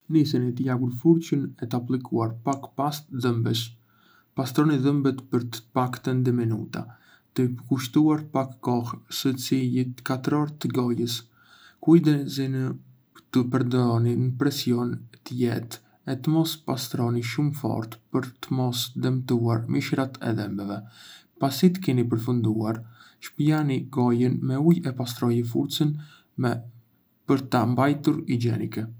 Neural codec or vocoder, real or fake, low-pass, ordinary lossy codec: none; real; none; none